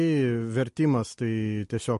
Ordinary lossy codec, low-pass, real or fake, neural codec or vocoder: MP3, 48 kbps; 14.4 kHz; real; none